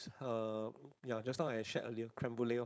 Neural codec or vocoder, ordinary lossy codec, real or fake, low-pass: codec, 16 kHz, 4.8 kbps, FACodec; none; fake; none